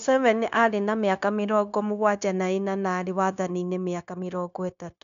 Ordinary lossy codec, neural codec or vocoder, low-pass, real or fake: none; codec, 16 kHz, 0.9 kbps, LongCat-Audio-Codec; 7.2 kHz; fake